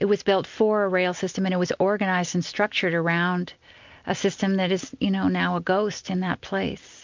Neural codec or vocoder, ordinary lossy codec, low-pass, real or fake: none; MP3, 64 kbps; 7.2 kHz; real